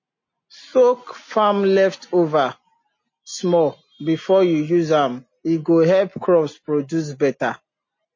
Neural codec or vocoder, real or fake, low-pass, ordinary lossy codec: none; real; 7.2 kHz; MP3, 32 kbps